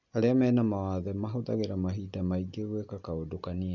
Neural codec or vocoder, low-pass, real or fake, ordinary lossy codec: none; 7.2 kHz; real; none